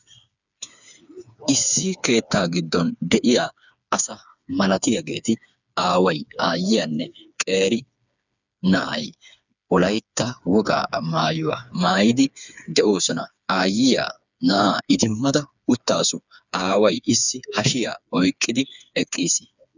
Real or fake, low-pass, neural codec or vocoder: fake; 7.2 kHz; codec, 16 kHz, 4 kbps, FreqCodec, smaller model